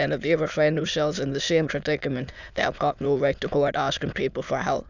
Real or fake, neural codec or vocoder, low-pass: fake; autoencoder, 22.05 kHz, a latent of 192 numbers a frame, VITS, trained on many speakers; 7.2 kHz